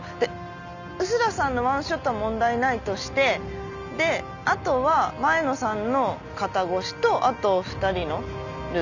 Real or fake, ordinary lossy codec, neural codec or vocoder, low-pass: real; none; none; 7.2 kHz